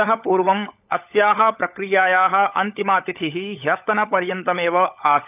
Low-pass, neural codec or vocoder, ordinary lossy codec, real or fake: 3.6 kHz; codec, 16 kHz, 16 kbps, FunCodec, trained on LibriTTS, 50 frames a second; none; fake